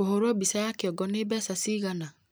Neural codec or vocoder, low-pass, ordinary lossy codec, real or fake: none; none; none; real